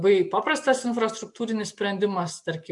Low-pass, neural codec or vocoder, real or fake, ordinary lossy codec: 10.8 kHz; none; real; MP3, 64 kbps